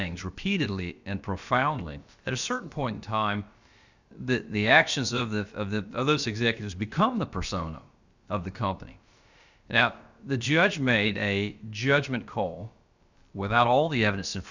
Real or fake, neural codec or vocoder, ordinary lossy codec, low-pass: fake; codec, 16 kHz, about 1 kbps, DyCAST, with the encoder's durations; Opus, 64 kbps; 7.2 kHz